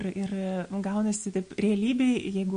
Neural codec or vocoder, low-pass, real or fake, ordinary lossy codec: none; 9.9 kHz; real; MP3, 48 kbps